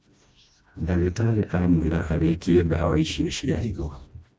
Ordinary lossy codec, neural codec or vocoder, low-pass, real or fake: none; codec, 16 kHz, 1 kbps, FreqCodec, smaller model; none; fake